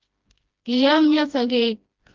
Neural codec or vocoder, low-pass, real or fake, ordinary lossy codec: codec, 16 kHz, 1 kbps, FreqCodec, smaller model; 7.2 kHz; fake; Opus, 24 kbps